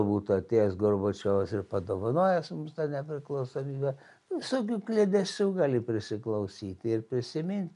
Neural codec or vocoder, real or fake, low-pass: none; real; 10.8 kHz